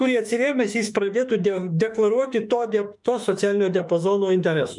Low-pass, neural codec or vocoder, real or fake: 10.8 kHz; autoencoder, 48 kHz, 32 numbers a frame, DAC-VAE, trained on Japanese speech; fake